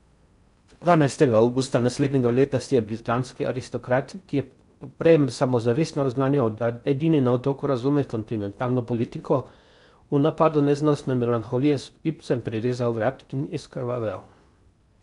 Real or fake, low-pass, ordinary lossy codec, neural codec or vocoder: fake; 10.8 kHz; none; codec, 16 kHz in and 24 kHz out, 0.6 kbps, FocalCodec, streaming, 4096 codes